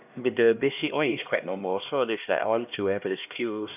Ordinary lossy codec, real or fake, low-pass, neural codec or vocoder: none; fake; 3.6 kHz; codec, 16 kHz, 1 kbps, X-Codec, HuBERT features, trained on LibriSpeech